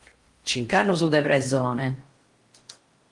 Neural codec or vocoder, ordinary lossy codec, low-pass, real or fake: codec, 16 kHz in and 24 kHz out, 0.8 kbps, FocalCodec, streaming, 65536 codes; Opus, 24 kbps; 10.8 kHz; fake